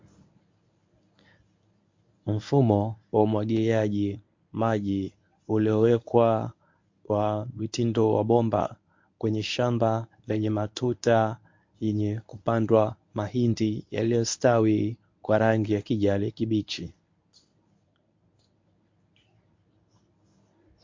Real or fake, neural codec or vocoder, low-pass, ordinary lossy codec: fake; codec, 24 kHz, 0.9 kbps, WavTokenizer, medium speech release version 1; 7.2 kHz; MP3, 48 kbps